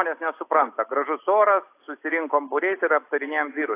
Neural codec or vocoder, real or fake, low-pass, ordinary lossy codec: none; real; 3.6 kHz; AAC, 24 kbps